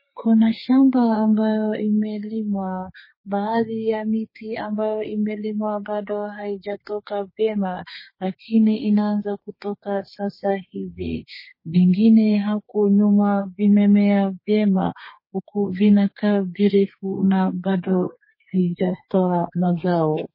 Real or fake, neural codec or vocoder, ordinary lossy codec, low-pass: fake; codec, 32 kHz, 1.9 kbps, SNAC; MP3, 24 kbps; 5.4 kHz